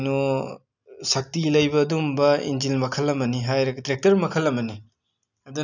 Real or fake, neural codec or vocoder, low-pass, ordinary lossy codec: real; none; 7.2 kHz; none